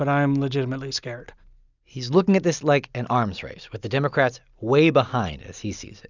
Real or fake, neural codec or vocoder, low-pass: real; none; 7.2 kHz